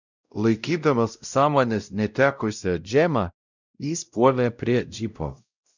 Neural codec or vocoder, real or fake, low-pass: codec, 16 kHz, 0.5 kbps, X-Codec, WavLM features, trained on Multilingual LibriSpeech; fake; 7.2 kHz